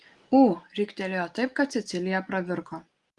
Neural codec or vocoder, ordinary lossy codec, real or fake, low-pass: none; Opus, 24 kbps; real; 10.8 kHz